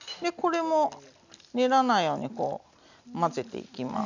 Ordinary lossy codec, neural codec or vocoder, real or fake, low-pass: none; none; real; 7.2 kHz